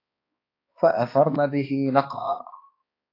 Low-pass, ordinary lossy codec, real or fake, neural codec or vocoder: 5.4 kHz; AAC, 32 kbps; fake; codec, 16 kHz, 2 kbps, X-Codec, HuBERT features, trained on balanced general audio